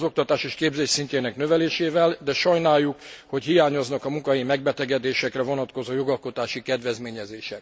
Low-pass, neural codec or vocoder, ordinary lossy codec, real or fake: none; none; none; real